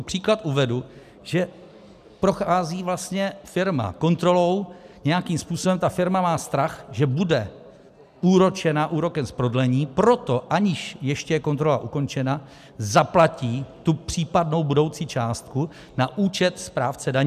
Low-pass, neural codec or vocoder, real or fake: 14.4 kHz; none; real